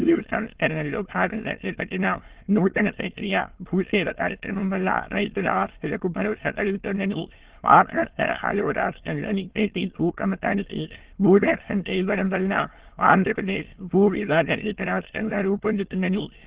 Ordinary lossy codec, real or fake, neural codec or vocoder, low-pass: Opus, 16 kbps; fake; autoencoder, 22.05 kHz, a latent of 192 numbers a frame, VITS, trained on many speakers; 3.6 kHz